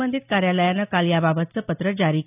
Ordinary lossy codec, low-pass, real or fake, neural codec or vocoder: none; 3.6 kHz; real; none